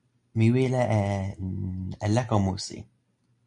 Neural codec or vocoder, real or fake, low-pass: none; real; 10.8 kHz